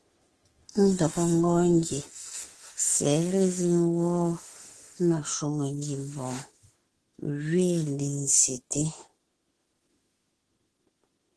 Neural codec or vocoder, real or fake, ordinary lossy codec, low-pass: autoencoder, 48 kHz, 32 numbers a frame, DAC-VAE, trained on Japanese speech; fake; Opus, 16 kbps; 10.8 kHz